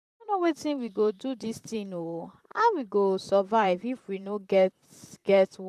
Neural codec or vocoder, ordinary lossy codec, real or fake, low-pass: vocoder, 44.1 kHz, 128 mel bands, Pupu-Vocoder; none; fake; 14.4 kHz